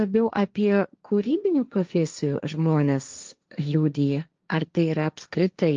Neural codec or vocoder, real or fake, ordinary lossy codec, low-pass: codec, 16 kHz, 1.1 kbps, Voila-Tokenizer; fake; Opus, 24 kbps; 7.2 kHz